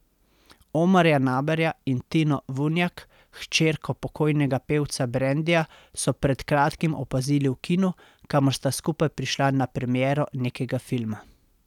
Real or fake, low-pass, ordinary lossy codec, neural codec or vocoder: real; 19.8 kHz; none; none